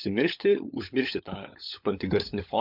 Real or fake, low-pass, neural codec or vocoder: fake; 5.4 kHz; codec, 16 kHz, 16 kbps, FunCodec, trained on LibriTTS, 50 frames a second